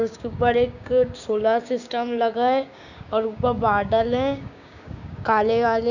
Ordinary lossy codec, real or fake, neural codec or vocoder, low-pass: none; fake; codec, 16 kHz, 6 kbps, DAC; 7.2 kHz